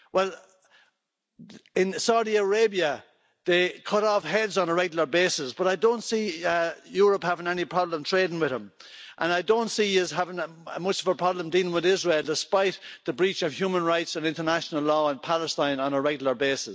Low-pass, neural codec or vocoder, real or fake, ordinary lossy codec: none; none; real; none